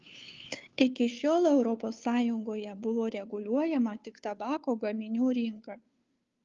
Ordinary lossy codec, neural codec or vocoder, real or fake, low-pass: Opus, 32 kbps; codec, 16 kHz, 2 kbps, FunCodec, trained on Chinese and English, 25 frames a second; fake; 7.2 kHz